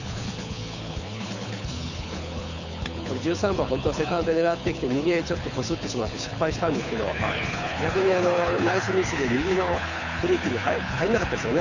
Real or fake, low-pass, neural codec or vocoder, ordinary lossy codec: fake; 7.2 kHz; codec, 24 kHz, 6 kbps, HILCodec; none